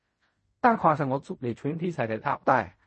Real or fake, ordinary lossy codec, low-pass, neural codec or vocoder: fake; MP3, 32 kbps; 10.8 kHz; codec, 16 kHz in and 24 kHz out, 0.4 kbps, LongCat-Audio-Codec, fine tuned four codebook decoder